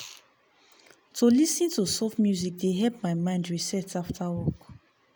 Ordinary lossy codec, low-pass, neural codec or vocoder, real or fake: none; none; none; real